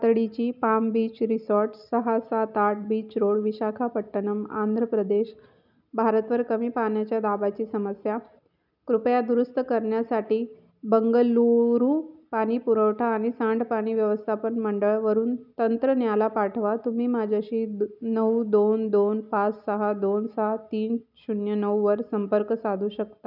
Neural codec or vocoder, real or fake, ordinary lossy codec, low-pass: none; real; none; 5.4 kHz